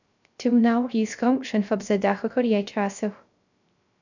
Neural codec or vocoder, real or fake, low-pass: codec, 16 kHz, 0.3 kbps, FocalCodec; fake; 7.2 kHz